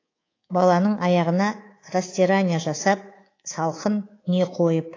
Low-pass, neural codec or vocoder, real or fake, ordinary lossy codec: 7.2 kHz; codec, 24 kHz, 3.1 kbps, DualCodec; fake; AAC, 32 kbps